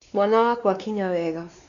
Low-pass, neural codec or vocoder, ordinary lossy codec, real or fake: 7.2 kHz; codec, 16 kHz, 2 kbps, X-Codec, WavLM features, trained on Multilingual LibriSpeech; MP3, 96 kbps; fake